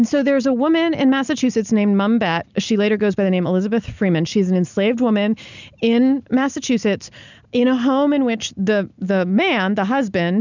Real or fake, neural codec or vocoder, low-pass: real; none; 7.2 kHz